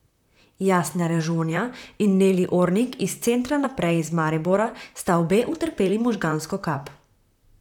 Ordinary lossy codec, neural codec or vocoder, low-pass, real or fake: none; vocoder, 44.1 kHz, 128 mel bands, Pupu-Vocoder; 19.8 kHz; fake